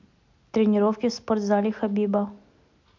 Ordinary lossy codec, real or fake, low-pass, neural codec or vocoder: MP3, 48 kbps; real; 7.2 kHz; none